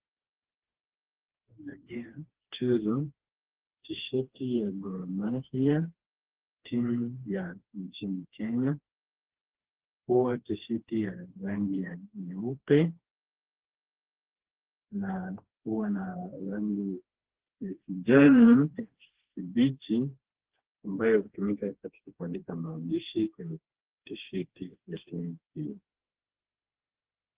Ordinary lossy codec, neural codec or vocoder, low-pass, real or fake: Opus, 16 kbps; codec, 16 kHz, 2 kbps, FreqCodec, smaller model; 3.6 kHz; fake